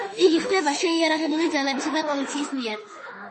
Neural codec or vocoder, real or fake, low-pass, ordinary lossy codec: autoencoder, 48 kHz, 32 numbers a frame, DAC-VAE, trained on Japanese speech; fake; 10.8 kHz; MP3, 32 kbps